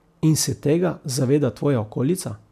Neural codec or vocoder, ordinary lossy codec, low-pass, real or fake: none; none; 14.4 kHz; real